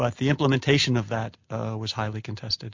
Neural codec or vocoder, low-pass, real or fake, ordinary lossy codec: none; 7.2 kHz; real; MP3, 48 kbps